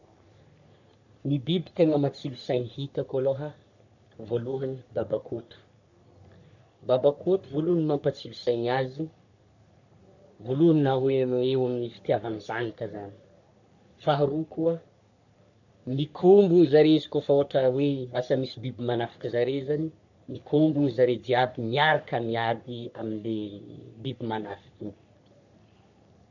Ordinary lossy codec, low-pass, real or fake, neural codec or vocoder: none; 7.2 kHz; fake; codec, 44.1 kHz, 3.4 kbps, Pupu-Codec